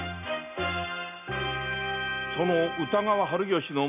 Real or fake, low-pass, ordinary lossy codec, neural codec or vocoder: real; 3.6 kHz; AAC, 32 kbps; none